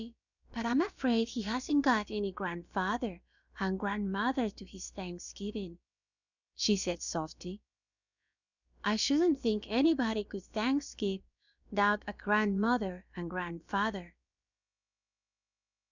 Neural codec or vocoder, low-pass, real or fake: codec, 16 kHz, about 1 kbps, DyCAST, with the encoder's durations; 7.2 kHz; fake